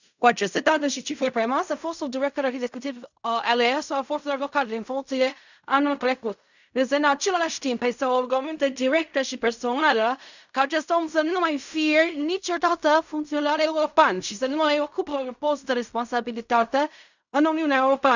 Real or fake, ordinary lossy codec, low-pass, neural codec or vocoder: fake; none; 7.2 kHz; codec, 16 kHz in and 24 kHz out, 0.4 kbps, LongCat-Audio-Codec, fine tuned four codebook decoder